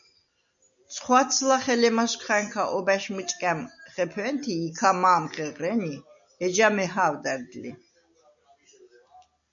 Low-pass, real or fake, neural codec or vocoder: 7.2 kHz; real; none